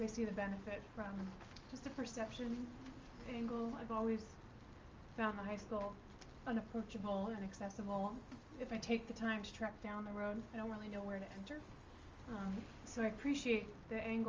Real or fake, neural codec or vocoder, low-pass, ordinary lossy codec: real; none; 7.2 kHz; Opus, 32 kbps